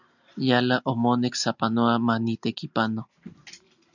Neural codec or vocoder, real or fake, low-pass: none; real; 7.2 kHz